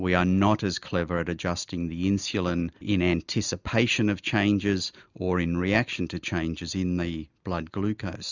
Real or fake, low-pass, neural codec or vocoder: real; 7.2 kHz; none